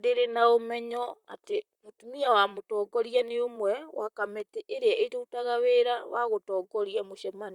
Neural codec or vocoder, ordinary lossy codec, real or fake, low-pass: vocoder, 44.1 kHz, 128 mel bands, Pupu-Vocoder; Opus, 64 kbps; fake; 19.8 kHz